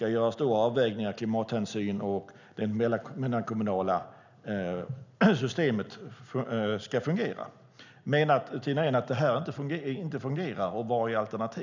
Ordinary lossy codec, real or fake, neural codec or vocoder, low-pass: none; real; none; 7.2 kHz